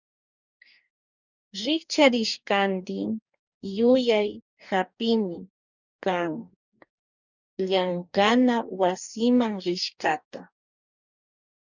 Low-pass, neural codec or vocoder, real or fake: 7.2 kHz; codec, 44.1 kHz, 2.6 kbps, DAC; fake